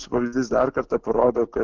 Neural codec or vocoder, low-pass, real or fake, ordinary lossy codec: none; 7.2 kHz; real; Opus, 16 kbps